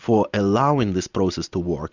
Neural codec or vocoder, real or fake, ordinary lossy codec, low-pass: none; real; Opus, 64 kbps; 7.2 kHz